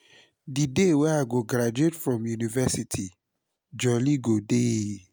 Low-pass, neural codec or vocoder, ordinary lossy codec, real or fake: none; none; none; real